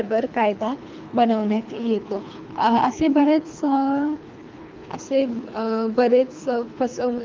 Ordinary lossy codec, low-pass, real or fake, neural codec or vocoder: Opus, 24 kbps; 7.2 kHz; fake; codec, 24 kHz, 3 kbps, HILCodec